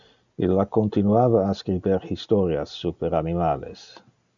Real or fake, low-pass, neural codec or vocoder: real; 7.2 kHz; none